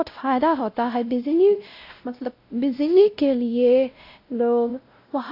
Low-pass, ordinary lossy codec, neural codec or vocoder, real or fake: 5.4 kHz; AAC, 32 kbps; codec, 16 kHz, 0.5 kbps, X-Codec, WavLM features, trained on Multilingual LibriSpeech; fake